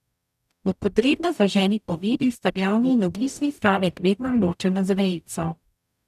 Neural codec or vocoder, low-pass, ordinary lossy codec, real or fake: codec, 44.1 kHz, 0.9 kbps, DAC; 14.4 kHz; none; fake